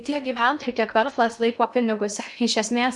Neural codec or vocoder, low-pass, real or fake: codec, 16 kHz in and 24 kHz out, 0.6 kbps, FocalCodec, streaming, 2048 codes; 10.8 kHz; fake